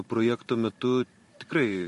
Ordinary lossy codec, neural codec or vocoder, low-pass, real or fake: MP3, 48 kbps; vocoder, 44.1 kHz, 128 mel bands every 512 samples, BigVGAN v2; 14.4 kHz; fake